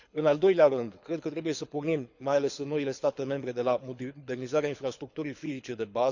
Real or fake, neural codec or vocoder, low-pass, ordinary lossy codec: fake; codec, 24 kHz, 6 kbps, HILCodec; 7.2 kHz; none